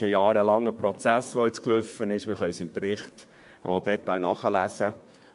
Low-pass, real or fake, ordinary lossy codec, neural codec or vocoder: 10.8 kHz; fake; MP3, 64 kbps; codec, 24 kHz, 1 kbps, SNAC